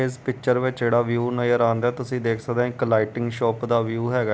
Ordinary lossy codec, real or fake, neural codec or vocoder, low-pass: none; real; none; none